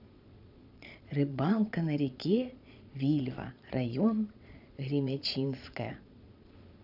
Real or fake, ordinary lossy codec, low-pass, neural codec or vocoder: fake; none; 5.4 kHz; vocoder, 22.05 kHz, 80 mel bands, Vocos